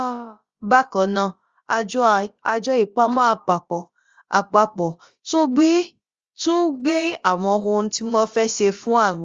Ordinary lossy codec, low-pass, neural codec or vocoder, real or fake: Opus, 32 kbps; 7.2 kHz; codec, 16 kHz, about 1 kbps, DyCAST, with the encoder's durations; fake